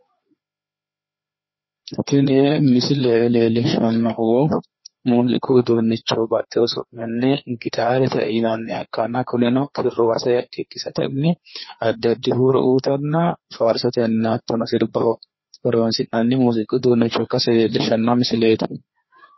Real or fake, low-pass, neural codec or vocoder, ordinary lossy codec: fake; 7.2 kHz; codec, 16 kHz, 2 kbps, FreqCodec, larger model; MP3, 24 kbps